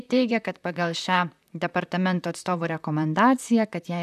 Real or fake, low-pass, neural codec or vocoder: fake; 14.4 kHz; vocoder, 44.1 kHz, 128 mel bands, Pupu-Vocoder